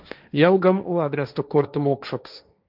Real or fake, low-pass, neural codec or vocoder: fake; 5.4 kHz; codec, 16 kHz, 1.1 kbps, Voila-Tokenizer